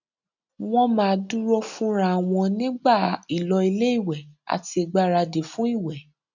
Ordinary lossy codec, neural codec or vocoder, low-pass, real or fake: none; none; 7.2 kHz; real